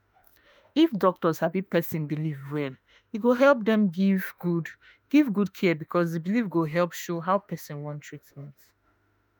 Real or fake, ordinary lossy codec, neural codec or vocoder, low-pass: fake; none; autoencoder, 48 kHz, 32 numbers a frame, DAC-VAE, trained on Japanese speech; none